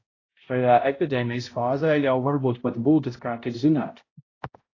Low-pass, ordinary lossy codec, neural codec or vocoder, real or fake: 7.2 kHz; AAC, 32 kbps; codec, 16 kHz, 0.5 kbps, X-Codec, HuBERT features, trained on balanced general audio; fake